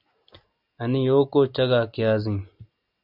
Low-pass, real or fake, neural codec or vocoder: 5.4 kHz; real; none